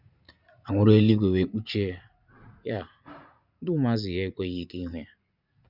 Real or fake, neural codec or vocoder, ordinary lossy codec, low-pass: fake; vocoder, 44.1 kHz, 80 mel bands, Vocos; none; 5.4 kHz